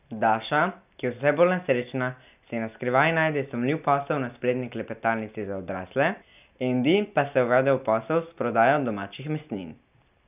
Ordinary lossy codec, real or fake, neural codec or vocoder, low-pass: none; real; none; 3.6 kHz